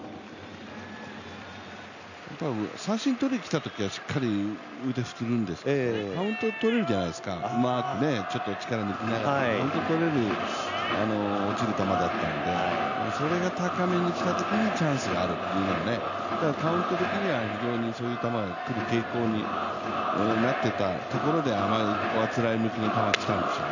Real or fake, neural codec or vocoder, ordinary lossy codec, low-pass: real; none; none; 7.2 kHz